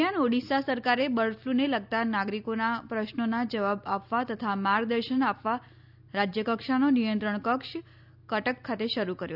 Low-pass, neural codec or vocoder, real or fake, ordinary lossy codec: 5.4 kHz; none; real; none